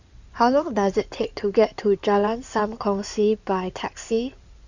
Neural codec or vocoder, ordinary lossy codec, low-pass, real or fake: codec, 16 kHz in and 24 kHz out, 2.2 kbps, FireRedTTS-2 codec; none; 7.2 kHz; fake